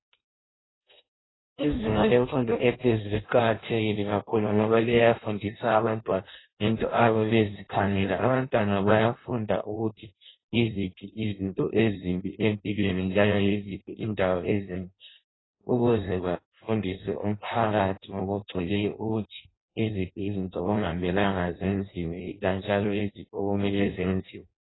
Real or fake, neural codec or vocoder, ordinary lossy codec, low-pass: fake; codec, 16 kHz in and 24 kHz out, 0.6 kbps, FireRedTTS-2 codec; AAC, 16 kbps; 7.2 kHz